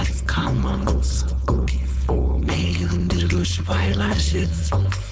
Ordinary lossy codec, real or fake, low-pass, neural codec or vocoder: none; fake; none; codec, 16 kHz, 4.8 kbps, FACodec